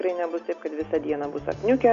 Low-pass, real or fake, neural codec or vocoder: 7.2 kHz; real; none